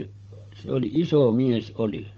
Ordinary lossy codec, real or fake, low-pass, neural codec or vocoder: Opus, 24 kbps; fake; 7.2 kHz; codec, 16 kHz, 16 kbps, FunCodec, trained on Chinese and English, 50 frames a second